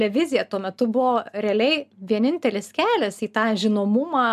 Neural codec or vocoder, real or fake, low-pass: none; real; 14.4 kHz